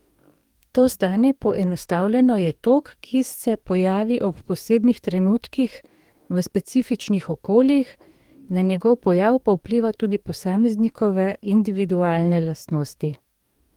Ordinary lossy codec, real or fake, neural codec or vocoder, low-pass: Opus, 32 kbps; fake; codec, 44.1 kHz, 2.6 kbps, DAC; 19.8 kHz